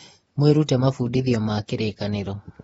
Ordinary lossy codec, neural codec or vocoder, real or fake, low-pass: AAC, 24 kbps; vocoder, 24 kHz, 100 mel bands, Vocos; fake; 10.8 kHz